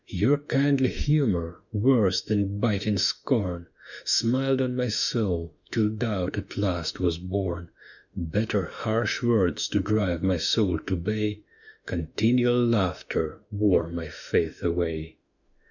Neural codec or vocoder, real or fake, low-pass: autoencoder, 48 kHz, 32 numbers a frame, DAC-VAE, trained on Japanese speech; fake; 7.2 kHz